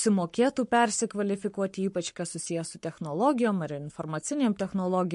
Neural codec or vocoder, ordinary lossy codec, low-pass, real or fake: codec, 44.1 kHz, 7.8 kbps, Pupu-Codec; MP3, 48 kbps; 14.4 kHz; fake